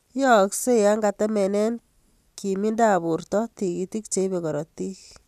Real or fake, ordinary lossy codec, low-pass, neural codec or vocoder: real; none; 14.4 kHz; none